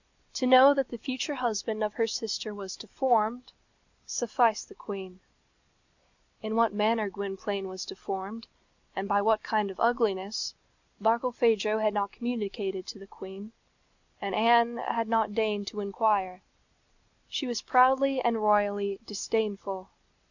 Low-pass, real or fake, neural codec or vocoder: 7.2 kHz; real; none